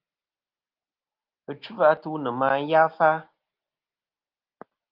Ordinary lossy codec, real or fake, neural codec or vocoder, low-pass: Opus, 32 kbps; real; none; 5.4 kHz